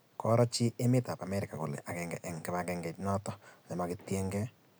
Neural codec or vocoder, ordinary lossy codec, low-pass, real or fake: none; none; none; real